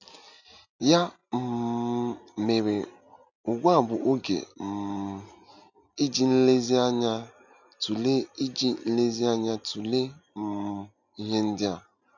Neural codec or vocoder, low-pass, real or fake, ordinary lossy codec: none; 7.2 kHz; real; none